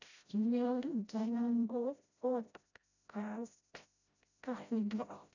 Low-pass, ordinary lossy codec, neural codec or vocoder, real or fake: 7.2 kHz; none; codec, 16 kHz, 0.5 kbps, FreqCodec, smaller model; fake